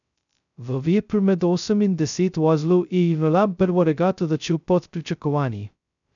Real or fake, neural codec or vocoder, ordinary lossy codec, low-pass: fake; codec, 16 kHz, 0.2 kbps, FocalCodec; none; 7.2 kHz